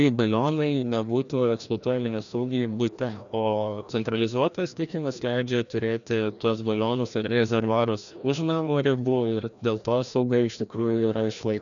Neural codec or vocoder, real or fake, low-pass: codec, 16 kHz, 1 kbps, FreqCodec, larger model; fake; 7.2 kHz